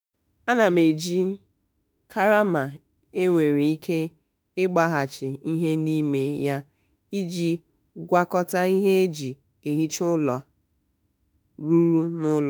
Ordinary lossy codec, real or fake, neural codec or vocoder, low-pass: none; fake; autoencoder, 48 kHz, 32 numbers a frame, DAC-VAE, trained on Japanese speech; none